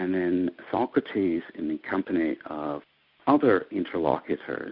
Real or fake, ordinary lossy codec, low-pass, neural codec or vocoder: real; Opus, 64 kbps; 5.4 kHz; none